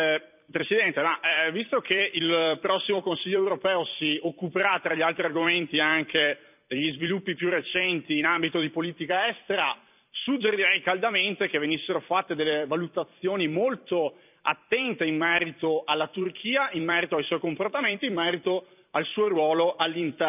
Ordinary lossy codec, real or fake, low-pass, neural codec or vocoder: none; fake; 3.6 kHz; vocoder, 44.1 kHz, 128 mel bands every 256 samples, BigVGAN v2